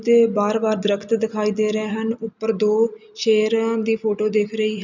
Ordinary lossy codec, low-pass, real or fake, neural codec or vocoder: none; 7.2 kHz; real; none